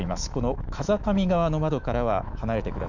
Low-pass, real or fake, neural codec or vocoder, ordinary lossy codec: 7.2 kHz; fake; autoencoder, 48 kHz, 128 numbers a frame, DAC-VAE, trained on Japanese speech; none